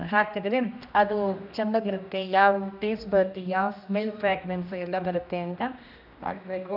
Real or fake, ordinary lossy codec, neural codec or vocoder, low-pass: fake; none; codec, 16 kHz, 1 kbps, X-Codec, HuBERT features, trained on general audio; 5.4 kHz